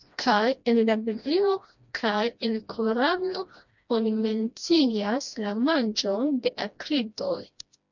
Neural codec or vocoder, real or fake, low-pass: codec, 16 kHz, 1 kbps, FreqCodec, smaller model; fake; 7.2 kHz